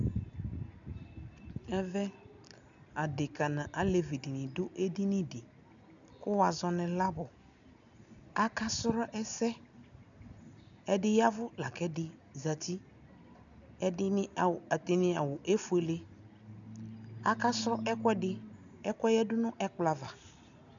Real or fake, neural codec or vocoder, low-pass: real; none; 7.2 kHz